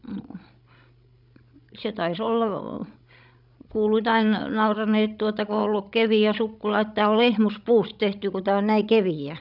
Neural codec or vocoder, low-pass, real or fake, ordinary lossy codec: codec, 16 kHz, 16 kbps, FreqCodec, larger model; 5.4 kHz; fake; none